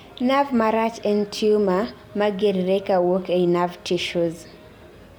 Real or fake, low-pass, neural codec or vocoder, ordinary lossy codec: fake; none; vocoder, 44.1 kHz, 128 mel bands every 256 samples, BigVGAN v2; none